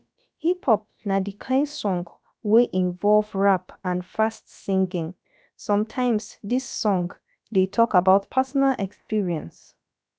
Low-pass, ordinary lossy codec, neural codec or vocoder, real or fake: none; none; codec, 16 kHz, about 1 kbps, DyCAST, with the encoder's durations; fake